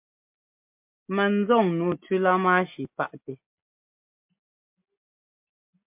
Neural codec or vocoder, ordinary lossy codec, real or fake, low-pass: none; MP3, 32 kbps; real; 3.6 kHz